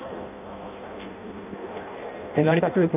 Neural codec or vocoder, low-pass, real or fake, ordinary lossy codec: codec, 16 kHz in and 24 kHz out, 0.6 kbps, FireRedTTS-2 codec; 3.6 kHz; fake; none